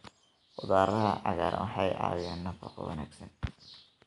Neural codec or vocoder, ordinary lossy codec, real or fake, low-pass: none; none; real; 10.8 kHz